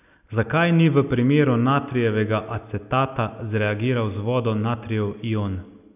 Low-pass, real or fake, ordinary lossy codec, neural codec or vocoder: 3.6 kHz; real; none; none